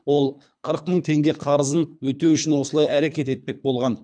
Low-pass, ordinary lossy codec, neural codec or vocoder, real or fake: 9.9 kHz; none; codec, 24 kHz, 3 kbps, HILCodec; fake